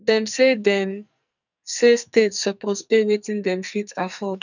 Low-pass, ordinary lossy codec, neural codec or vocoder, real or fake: 7.2 kHz; none; codec, 44.1 kHz, 2.6 kbps, SNAC; fake